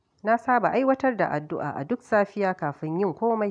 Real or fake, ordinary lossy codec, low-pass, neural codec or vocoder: real; none; 9.9 kHz; none